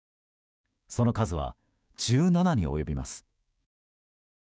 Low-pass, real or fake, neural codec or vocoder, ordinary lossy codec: none; fake; codec, 16 kHz, 6 kbps, DAC; none